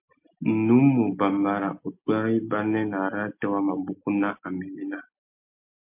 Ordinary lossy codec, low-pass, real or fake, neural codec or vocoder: MP3, 32 kbps; 3.6 kHz; real; none